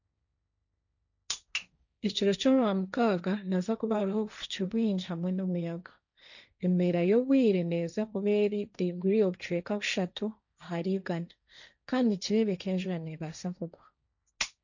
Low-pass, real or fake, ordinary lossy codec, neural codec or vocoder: 7.2 kHz; fake; none; codec, 16 kHz, 1.1 kbps, Voila-Tokenizer